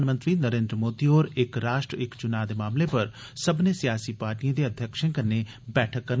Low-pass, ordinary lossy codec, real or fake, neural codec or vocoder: none; none; real; none